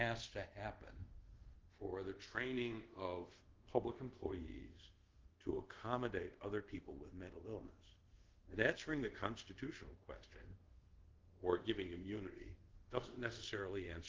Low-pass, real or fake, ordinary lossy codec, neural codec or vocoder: 7.2 kHz; fake; Opus, 16 kbps; codec, 24 kHz, 0.5 kbps, DualCodec